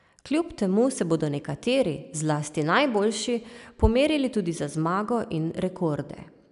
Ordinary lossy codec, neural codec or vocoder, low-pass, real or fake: none; none; 10.8 kHz; real